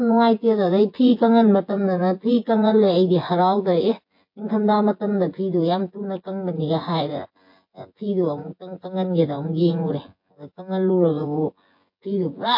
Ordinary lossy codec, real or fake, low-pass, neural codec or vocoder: MP3, 32 kbps; fake; 5.4 kHz; vocoder, 24 kHz, 100 mel bands, Vocos